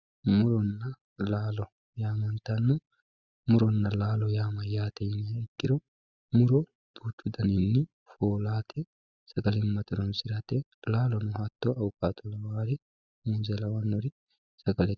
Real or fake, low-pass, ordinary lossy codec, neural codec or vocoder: real; 7.2 kHz; Opus, 64 kbps; none